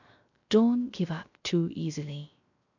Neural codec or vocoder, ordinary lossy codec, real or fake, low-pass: codec, 16 kHz, 0.3 kbps, FocalCodec; none; fake; 7.2 kHz